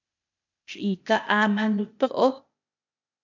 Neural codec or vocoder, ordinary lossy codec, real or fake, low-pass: codec, 16 kHz, 0.8 kbps, ZipCodec; MP3, 64 kbps; fake; 7.2 kHz